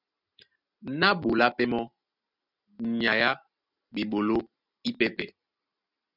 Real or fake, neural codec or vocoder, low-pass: real; none; 5.4 kHz